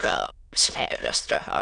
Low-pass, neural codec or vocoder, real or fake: 9.9 kHz; autoencoder, 22.05 kHz, a latent of 192 numbers a frame, VITS, trained on many speakers; fake